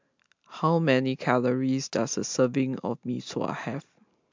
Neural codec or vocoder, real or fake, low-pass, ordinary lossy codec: none; real; 7.2 kHz; MP3, 64 kbps